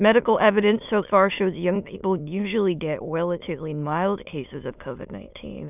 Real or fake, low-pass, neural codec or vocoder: fake; 3.6 kHz; autoencoder, 22.05 kHz, a latent of 192 numbers a frame, VITS, trained on many speakers